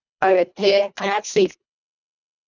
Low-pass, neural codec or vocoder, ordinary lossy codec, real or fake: 7.2 kHz; codec, 24 kHz, 1.5 kbps, HILCodec; none; fake